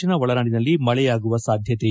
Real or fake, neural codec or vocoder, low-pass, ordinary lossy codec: real; none; none; none